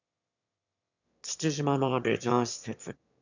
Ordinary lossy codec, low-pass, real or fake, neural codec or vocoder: none; 7.2 kHz; fake; autoencoder, 22.05 kHz, a latent of 192 numbers a frame, VITS, trained on one speaker